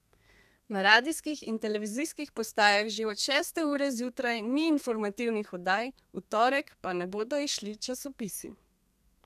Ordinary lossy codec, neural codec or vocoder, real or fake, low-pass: none; codec, 32 kHz, 1.9 kbps, SNAC; fake; 14.4 kHz